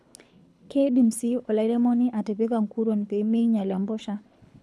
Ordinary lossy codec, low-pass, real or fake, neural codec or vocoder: none; none; fake; codec, 24 kHz, 6 kbps, HILCodec